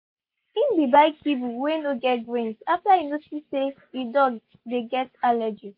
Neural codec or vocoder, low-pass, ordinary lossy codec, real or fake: none; 5.4 kHz; MP3, 32 kbps; real